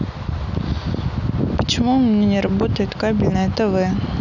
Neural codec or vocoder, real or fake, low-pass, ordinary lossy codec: none; real; 7.2 kHz; none